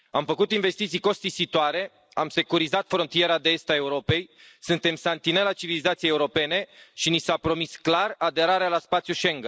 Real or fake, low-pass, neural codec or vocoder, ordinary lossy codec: real; none; none; none